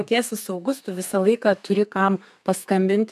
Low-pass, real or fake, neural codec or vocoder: 14.4 kHz; fake; codec, 32 kHz, 1.9 kbps, SNAC